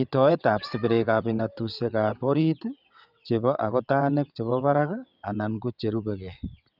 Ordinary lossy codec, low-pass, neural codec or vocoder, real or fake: none; 5.4 kHz; vocoder, 22.05 kHz, 80 mel bands, WaveNeXt; fake